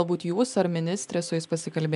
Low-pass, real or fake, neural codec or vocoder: 10.8 kHz; real; none